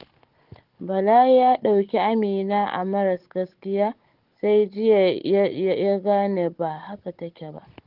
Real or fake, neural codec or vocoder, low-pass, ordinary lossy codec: fake; codec, 16 kHz, 16 kbps, FunCodec, trained on Chinese and English, 50 frames a second; 5.4 kHz; Opus, 16 kbps